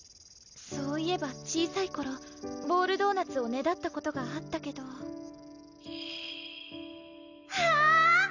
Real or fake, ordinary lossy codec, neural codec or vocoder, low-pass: real; none; none; 7.2 kHz